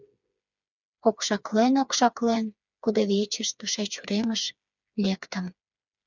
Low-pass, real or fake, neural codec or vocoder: 7.2 kHz; fake; codec, 16 kHz, 4 kbps, FreqCodec, smaller model